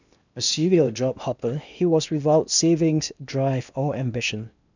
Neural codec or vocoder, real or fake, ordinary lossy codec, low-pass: codec, 16 kHz in and 24 kHz out, 0.8 kbps, FocalCodec, streaming, 65536 codes; fake; none; 7.2 kHz